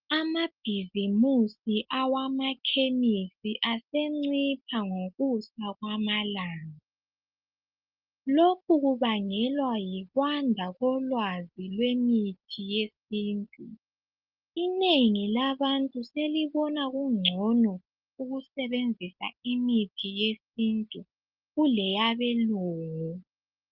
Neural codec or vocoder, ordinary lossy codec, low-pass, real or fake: none; Opus, 32 kbps; 5.4 kHz; real